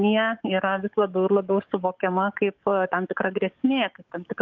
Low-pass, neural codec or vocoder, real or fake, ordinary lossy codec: 7.2 kHz; codec, 44.1 kHz, 7.8 kbps, DAC; fake; Opus, 32 kbps